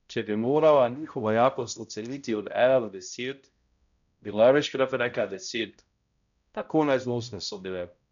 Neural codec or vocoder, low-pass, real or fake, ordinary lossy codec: codec, 16 kHz, 0.5 kbps, X-Codec, HuBERT features, trained on balanced general audio; 7.2 kHz; fake; none